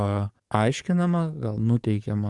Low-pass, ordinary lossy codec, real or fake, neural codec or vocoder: 10.8 kHz; Opus, 64 kbps; fake; codec, 44.1 kHz, 7.8 kbps, DAC